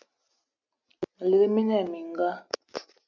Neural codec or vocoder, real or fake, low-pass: none; real; 7.2 kHz